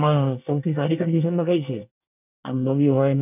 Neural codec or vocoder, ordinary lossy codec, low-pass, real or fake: codec, 24 kHz, 1 kbps, SNAC; none; 3.6 kHz; fake